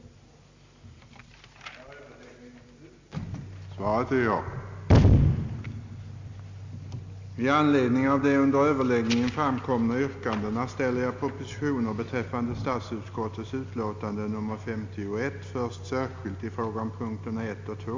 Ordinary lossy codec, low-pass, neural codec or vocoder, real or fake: MP3, 48 kbps; 7.2 kHz; none; real